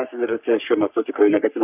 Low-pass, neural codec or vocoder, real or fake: 3.6 kHz; codec, 44.1 kHz, 3.4 kbps, Pupu-Codec; fake